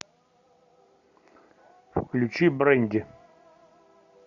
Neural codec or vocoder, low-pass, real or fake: none; 7.2 kHz; real